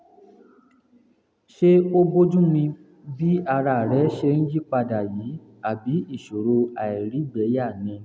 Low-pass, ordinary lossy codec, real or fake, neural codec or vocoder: none; none; real; none